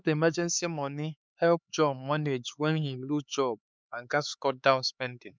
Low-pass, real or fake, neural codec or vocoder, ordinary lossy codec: none; fake; codec, 16 kHz, 4 kbps, X-Codec, HuBERT features, trained on LibriSpeech; none